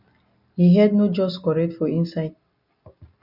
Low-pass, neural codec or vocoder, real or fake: 5.4 kHz; none; real